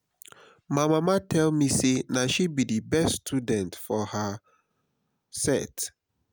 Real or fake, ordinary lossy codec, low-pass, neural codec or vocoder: real; none; none; none